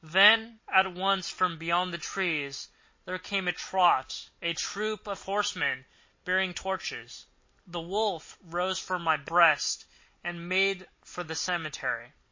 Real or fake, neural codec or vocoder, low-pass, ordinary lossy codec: real; none; 7.2 kHz; MP3, 32 kbps